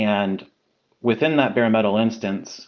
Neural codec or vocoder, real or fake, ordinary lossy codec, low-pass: none; real; Opus, 32 kbps; 7.2 kHz